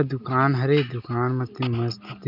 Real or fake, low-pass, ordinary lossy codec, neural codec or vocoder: real; 5.4 kHz; none; none